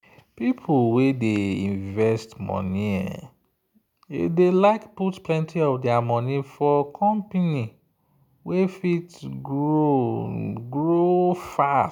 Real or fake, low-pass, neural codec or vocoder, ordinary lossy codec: real; 19.8 kHz; none; none